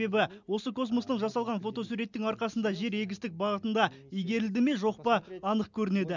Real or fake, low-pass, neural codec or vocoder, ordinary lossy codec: real; 7.2 kHz; none; none